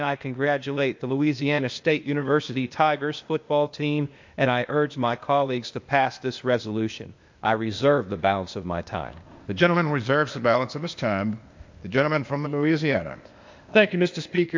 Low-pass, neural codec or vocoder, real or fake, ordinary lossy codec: 7.2 kHz; codec, 16 kHz, 0.8 kbps, ZipCodec; fake; MP3, 48 kbps